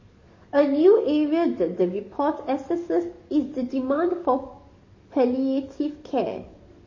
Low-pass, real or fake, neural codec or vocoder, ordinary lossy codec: 7.2 kHz; fake; codec, 44.1 kHz, 7.8 kbps, DAC; MP3, 32 kbps